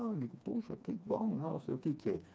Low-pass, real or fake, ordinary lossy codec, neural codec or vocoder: none; fake; none; codec, 16 kHz, 2 kbps, FreqCodec, smaller model